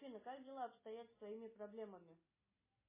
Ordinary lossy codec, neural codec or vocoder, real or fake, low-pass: MP3, 16 kbps; none; real; 3.6 kHz